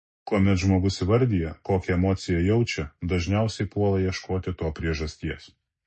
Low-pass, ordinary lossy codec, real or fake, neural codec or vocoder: 10.8 kHz; MP3, 32 kbps; real; none